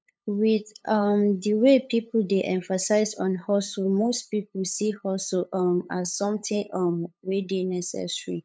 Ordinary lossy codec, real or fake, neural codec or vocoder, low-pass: none; fake; codec, 16 kHz, 8 kbps, FunCodec, trained on LibriTTS, 25 frames a second; none